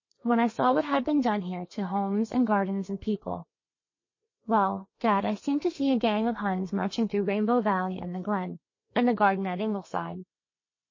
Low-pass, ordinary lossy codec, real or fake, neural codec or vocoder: 7.2 kHz; MP3, 32 kbps; fake; codec, 32 kHz, 1.9 kbps, SNAC